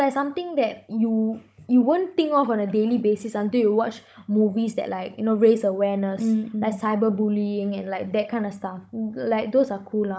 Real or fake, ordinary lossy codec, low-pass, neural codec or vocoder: fake; none; none; codec, 16 kHz, 16 kbps, FunCodec, trained on Chinese and English, 50 frames a second